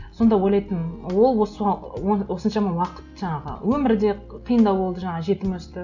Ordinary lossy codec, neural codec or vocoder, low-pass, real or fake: none; none; 7.2 kHz; real